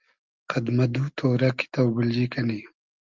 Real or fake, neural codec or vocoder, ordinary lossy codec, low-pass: real; none; Opus, 24 kbps; 7.2 kHz